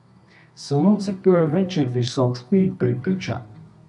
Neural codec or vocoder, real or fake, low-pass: codec, 24 kHz, 0.9 kbps, WavTokenizer, medium music audio release; fake; 10.8 kHz